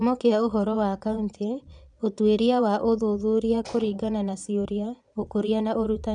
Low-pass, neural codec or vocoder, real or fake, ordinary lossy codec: 9.9 kHz; vocoder, 22.05 kHz, 80 mel bands, Vocos; fake; none